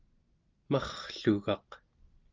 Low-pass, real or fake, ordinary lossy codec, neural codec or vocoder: 7.2 kHz; real; Opus, 24 kbps; none